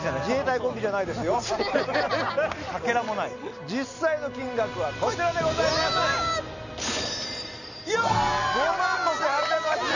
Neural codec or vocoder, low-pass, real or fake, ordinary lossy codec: none; 7.2 kHz; real; none